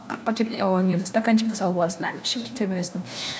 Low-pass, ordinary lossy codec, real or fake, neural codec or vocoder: none; none; fake; codec, 16 kHz, 1 kbps, FunCodec, trained on LibriTTS, 50 frames a second